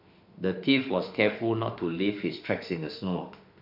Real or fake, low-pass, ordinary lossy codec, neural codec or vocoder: fake; 5.4 kHz; none; autoencoder, 48 kHz, 32 numbers a frame, DAC-VAE, trained on Japanese speech